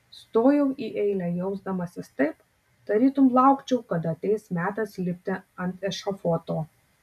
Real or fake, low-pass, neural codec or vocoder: real; 14.4 kHz; none